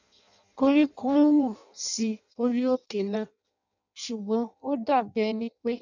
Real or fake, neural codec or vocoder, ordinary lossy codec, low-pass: fake; codec, 16 kHz in and 24 kHz out, 0.6 kbps, FireRedTTS-2 codec; none; 7.2 kHz